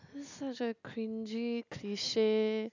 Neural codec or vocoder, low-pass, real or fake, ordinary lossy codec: none; 7.2 kHz; real; none